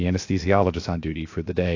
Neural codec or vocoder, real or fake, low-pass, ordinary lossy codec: codec, 16 kHz, 0.7 kbps, FocalCodec; fake; 7.2 kHz; AAC, 48 kbps